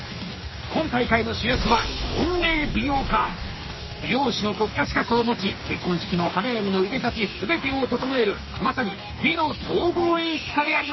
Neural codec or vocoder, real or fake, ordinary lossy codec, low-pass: codec, 44.1 kHz, 2.6 kbps, DAC; fake; MP3, 24 kbps; 7.2 kHz